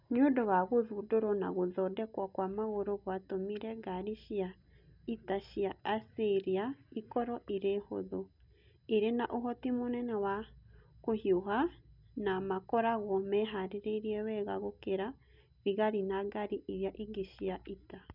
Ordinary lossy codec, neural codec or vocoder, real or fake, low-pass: none; none; real; 5.4 kHz